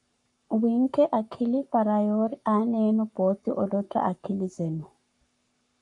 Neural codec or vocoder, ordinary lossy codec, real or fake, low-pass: codec, 44.1 kHz, 7.8 kbps, Pupu-Codec; AAC, 64 kbps; fake; 10.8 kHz